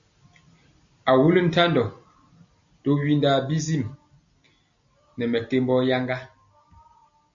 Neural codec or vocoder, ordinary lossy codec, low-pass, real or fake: none; MP3, 64 kbps; 7.2 kHz; real